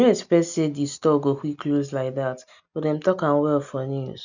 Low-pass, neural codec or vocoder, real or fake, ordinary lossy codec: 7.2 kHz; none; real; none